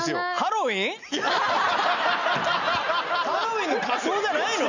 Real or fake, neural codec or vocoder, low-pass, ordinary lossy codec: real; none; 7.2 kHz; none